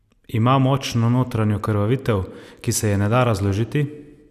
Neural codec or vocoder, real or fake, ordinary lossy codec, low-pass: none; real; none; 14.4 kHz